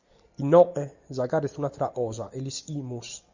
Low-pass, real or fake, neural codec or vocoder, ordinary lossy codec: 7.2 kHz; real; none; Opus, 64 kbps